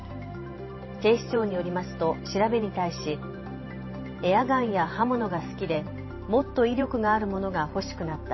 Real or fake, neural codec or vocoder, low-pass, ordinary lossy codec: fake; vocoder, 44.1 kHz, 128 mel bands every 512 samples, BigVGAN v2; 7.2 kHz; MP3, 24 kbps